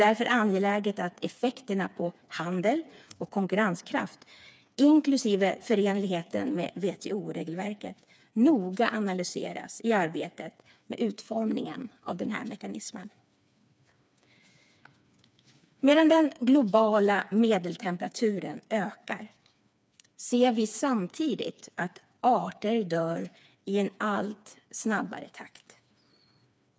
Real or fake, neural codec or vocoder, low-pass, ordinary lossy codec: fake; codec, 16 kHz, 4 kbps, FreqCodec, smaller model; none; none